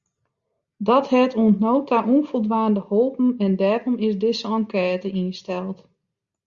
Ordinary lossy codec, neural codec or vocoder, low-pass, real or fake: Opus, 64 kbps; none; 7.2 kHz; real